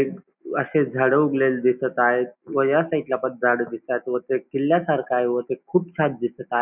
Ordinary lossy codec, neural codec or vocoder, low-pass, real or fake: none; none; 3.6 kHz; real